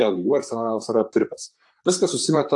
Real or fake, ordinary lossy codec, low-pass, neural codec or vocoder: fake; AAC, 64 kbps; 10.8 kHz; vocoder, 44.1 kHz, 128 mel bands, Pupu-Vocoder